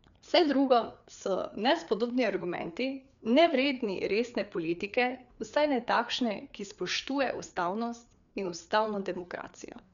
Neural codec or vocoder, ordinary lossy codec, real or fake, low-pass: codec, 16 kHz, 4 kbps, FreqCodec, larger model; none; fake; 7.2 kHz